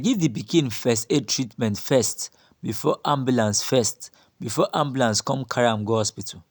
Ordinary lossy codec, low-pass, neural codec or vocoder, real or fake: none; none; none; real